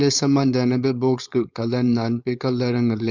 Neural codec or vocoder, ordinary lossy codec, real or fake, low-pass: codec, 16 kHz, 4.8 kbps, FACodec; Opus, 64 kbps; fake; 7.2 kHz